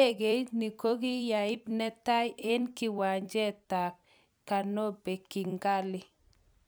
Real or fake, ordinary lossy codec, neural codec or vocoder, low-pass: fake; none; vocoder, 44.1 kHz, 128 mel bands every 256 samples, BigVGAN v2; none